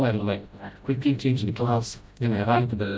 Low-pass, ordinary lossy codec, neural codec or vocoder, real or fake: none; none; codec, 16 kHz, 0.5 kbps, FreqCodec, smaller model; fake